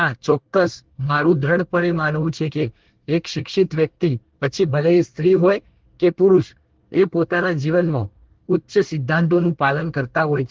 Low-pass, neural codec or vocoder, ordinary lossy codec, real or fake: 7.2 kHz; codec, 24 kHz, 1 kbps, SNAC; Opus, 16 kbps; fake